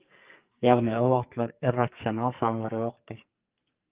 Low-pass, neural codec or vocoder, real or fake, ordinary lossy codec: 3.6 kHz; codec, 44.1 kHz, 2.6 kbps, SNAC; fake; Opus, 32 kbps